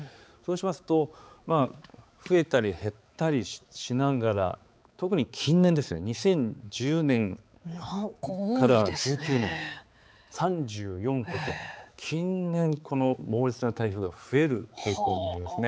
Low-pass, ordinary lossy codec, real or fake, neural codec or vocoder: none; none; fake; codec, 16 kHz, 4 kbps, X-Codec, HuBERT features, trained on balanced general audio